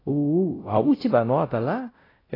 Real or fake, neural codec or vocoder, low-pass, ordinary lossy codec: fake; codec, 16 kHz, 0.5 kbps, X-Codec, WavLM features, trained on Multilingual LibriSpeech; 5.4 kHz; AAC, 24 kbps